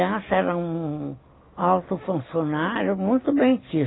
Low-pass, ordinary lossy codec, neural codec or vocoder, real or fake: 7.2 kHz; AAC, 16 kbps; none; real